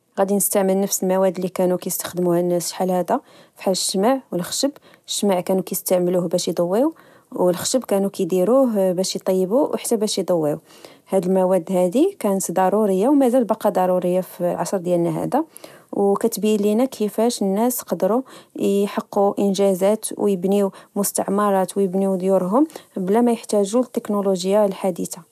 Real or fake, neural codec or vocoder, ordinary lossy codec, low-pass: real; none; none; 14.4 kHz